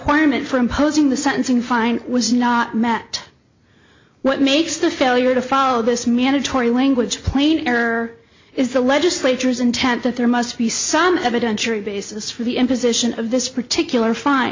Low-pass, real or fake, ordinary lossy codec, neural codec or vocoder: 7.2 kHz; real; MP3, 48 kbps; none